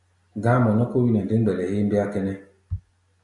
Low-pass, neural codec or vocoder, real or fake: 10.8 kHz; none; real